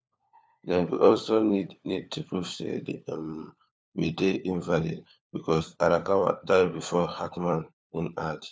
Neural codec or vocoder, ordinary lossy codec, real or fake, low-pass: codec, 16 kHz, 4 kbps, FunCodec, trained on LibriTTS, 50 frames a second; none; fake; none